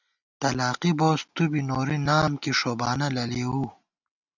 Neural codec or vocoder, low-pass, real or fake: none; 7.2 kHz; real